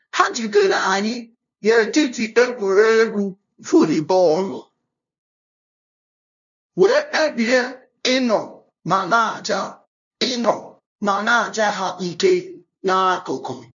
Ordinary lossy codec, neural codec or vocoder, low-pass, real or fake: none; codec, 16 kHz, 0.5 kbps, FunCodec, trained on LibriTTS, 25 frames a second; 7.2 kHz; fake